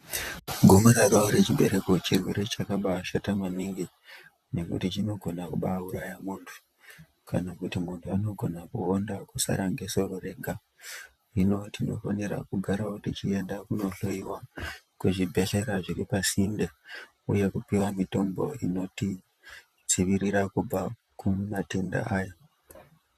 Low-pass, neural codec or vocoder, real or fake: 14.4 kHz; vocoder, 44.1 kHz, 128 mel bands, Pupu-Vocoder; fake